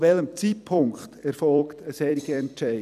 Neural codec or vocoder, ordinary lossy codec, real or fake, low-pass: vocoder, 44.1 kHz, 128 mel bands every 256 samples, BigVGAN v2; none; fake; 14.4 kHz